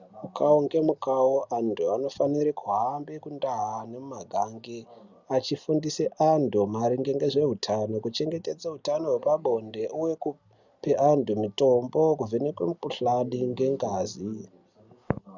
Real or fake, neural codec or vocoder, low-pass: real; none; 7.2 kHz